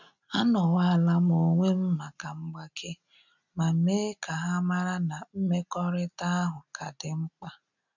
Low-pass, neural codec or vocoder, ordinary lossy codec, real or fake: 7.2 kHz; none; none; real